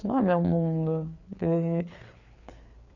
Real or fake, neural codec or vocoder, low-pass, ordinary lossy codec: fake; codec, 16 kHz, 4 kbps, FreqCodec, larger model; 7.2 kHz; none